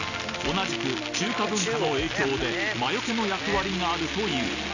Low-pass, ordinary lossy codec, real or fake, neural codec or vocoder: 7.2 kHz; none; real; none